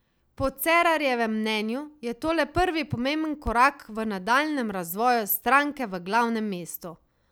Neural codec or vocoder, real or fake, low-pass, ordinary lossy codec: none; real; none; none